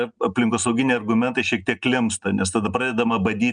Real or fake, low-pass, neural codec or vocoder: real; 9.9 kHz; none